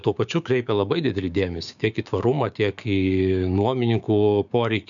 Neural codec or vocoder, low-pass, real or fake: codec, 16 kHz, 6 kbps, DAC; 7.2 kHz; fake